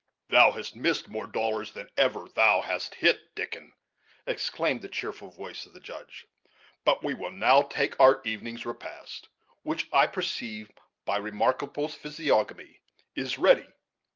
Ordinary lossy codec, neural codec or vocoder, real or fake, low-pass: Opus, 32 kbps; none; real; 7.2 kHz